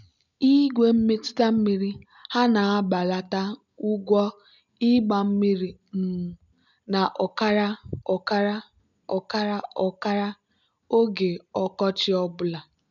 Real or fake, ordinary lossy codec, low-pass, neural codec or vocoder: real; none; 7.2 kHz; none